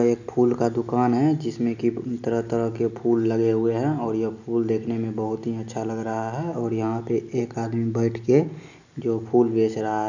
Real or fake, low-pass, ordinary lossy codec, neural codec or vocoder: real; 7.2 kHz; none; none